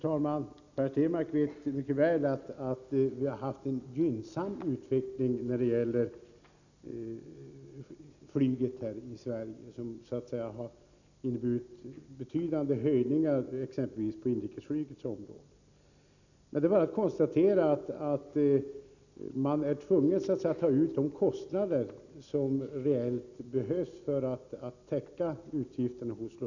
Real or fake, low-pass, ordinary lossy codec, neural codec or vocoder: real; 7.2 kHz; none; none